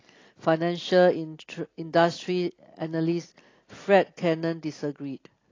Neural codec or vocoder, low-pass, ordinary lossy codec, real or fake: none; 7.2 kHz; AAC, 32 kbps; real